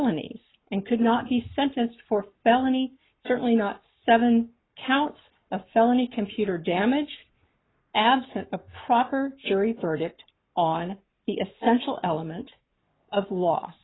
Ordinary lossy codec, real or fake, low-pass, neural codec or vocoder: AAC, 16 kbps; fake; 7.2 kHz; codec, 44.1 kHz, 7.8 kbps, Pupu-Codec